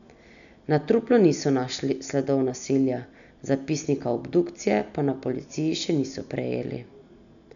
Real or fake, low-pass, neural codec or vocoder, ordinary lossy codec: real; 7.2 kHz; none; none